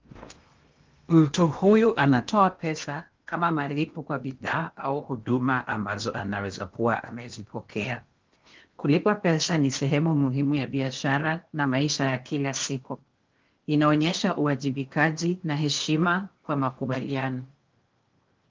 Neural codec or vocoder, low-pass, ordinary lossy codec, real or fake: codec, 16 kHz in and 24 kHz out, 0.8 kbps, FocalCodec, streaming, 65536 codes; 7.2 kHz; Opus, 32 kbps; fake